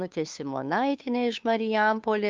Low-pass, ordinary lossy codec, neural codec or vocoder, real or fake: 7.2 kHz; Opus, 24 kbps; codec, 16 kHz, 2 kbps, FunCodec, trained on Chinese and English, 25 frames a second; fake